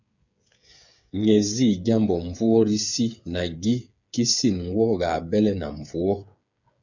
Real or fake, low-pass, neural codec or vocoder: fake; 7.2 kHz; codec, 16 kHz, 8 kbps, FreqCodec, smaller model